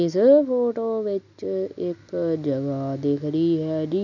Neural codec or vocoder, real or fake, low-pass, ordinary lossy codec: none; real; 7.2 kHz; none